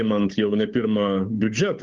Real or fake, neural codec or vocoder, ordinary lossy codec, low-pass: fake; codec, 16 kHz, 8 kbps, FunCodec, trained on Chinese and English, 25 frames a second; Opus, 24 kbps; 7.2 kHz